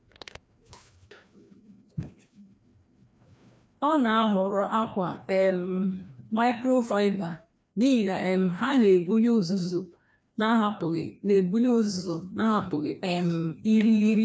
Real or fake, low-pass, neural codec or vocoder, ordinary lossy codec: fake; none; codec, 16 kHz, 1 kbps, FreqCodec, larger model; none